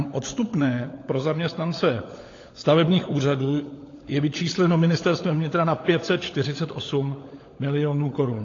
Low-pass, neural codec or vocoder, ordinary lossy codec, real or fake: 7.2 kHz; codec, 16 kHz, 16 kbps, FunCodec, trained on LibriTTS, 50 frames a second; AAC, 32 kbps; fake